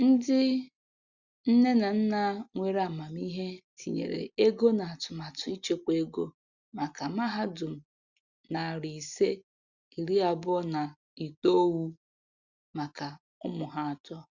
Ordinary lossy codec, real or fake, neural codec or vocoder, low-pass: Opus, 64 kbps; real; none; 7.2 kHz